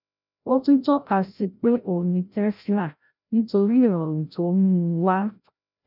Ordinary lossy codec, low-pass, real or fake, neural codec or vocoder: none; 5.4 kHz; fake; codec, 16 kHz, 0.5 kbps, FreqCodec, larger model